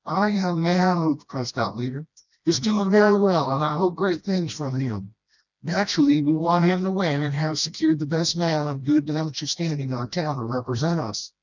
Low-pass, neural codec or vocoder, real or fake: 7.2 kHz; codec, 16 kHz, 1 kbps, FreqCodec, smaller model; fake